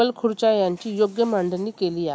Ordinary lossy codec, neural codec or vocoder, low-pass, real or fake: none; none; none; real